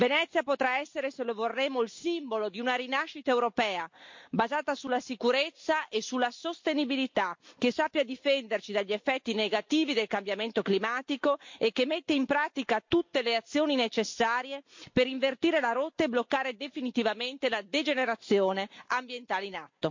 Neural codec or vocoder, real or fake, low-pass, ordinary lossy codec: none; real; 7.2 kHz; MP3, 48 kbps